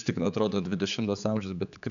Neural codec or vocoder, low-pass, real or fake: codec, 16 kHz, 4 kbps, X-Codec, HuBERT features, trained on general audio; 7.2 kHz; fake